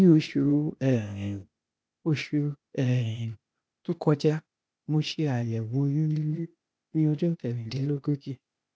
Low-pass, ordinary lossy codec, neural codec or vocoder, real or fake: none; none; codec, 16 kHz, 0.8 kbps, ZipCodec; fake